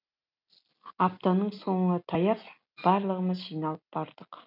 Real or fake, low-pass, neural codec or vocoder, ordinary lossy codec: real; 5.4 kHz; none; none